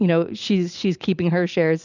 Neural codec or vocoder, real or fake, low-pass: none; real; 7.2 kHz